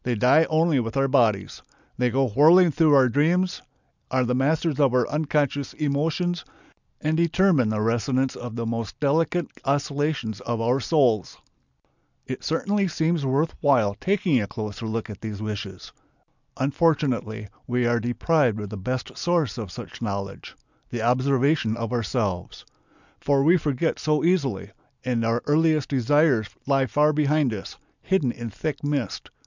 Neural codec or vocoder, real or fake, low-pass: none; real; 7.2 kHz